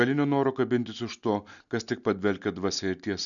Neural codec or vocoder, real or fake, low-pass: none; real; 7.2 kHz